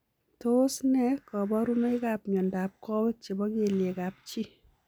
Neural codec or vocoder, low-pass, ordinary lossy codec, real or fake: none; none; none; real